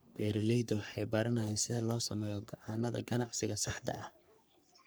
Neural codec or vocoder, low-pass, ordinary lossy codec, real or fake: codec, 44.1 kHz, 3.4 kbps, Pupu-Codec; none; none; fake